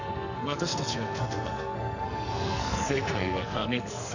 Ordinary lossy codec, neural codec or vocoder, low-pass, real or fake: none; codec, 44.1 kHz, 2.6 kbps, SNAC; 7.2 kHz; fake